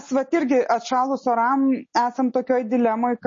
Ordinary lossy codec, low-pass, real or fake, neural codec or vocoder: MP3, 32 kbps; 7.2 kHz; real; none